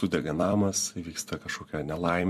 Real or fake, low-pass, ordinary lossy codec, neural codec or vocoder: fake; 14.4 kHz; MP3, 64 kbps; vocoder, 44.1 kHz, 128 mel bands every 256 samples, BigVGAN v2